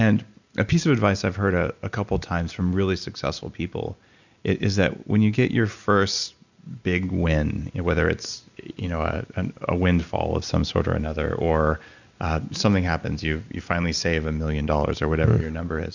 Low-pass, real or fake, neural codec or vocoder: 7.2 kHz; real; none